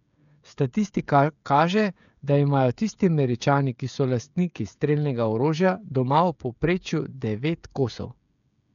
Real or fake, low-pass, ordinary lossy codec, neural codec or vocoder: fake; 7.2 kHz; none; codec, 16 kHz, 16 kbps, FreqCodec, smaller model